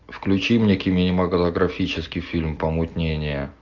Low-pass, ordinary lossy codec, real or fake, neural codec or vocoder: 7.2 kHz; MP3, 64 kbps; real; none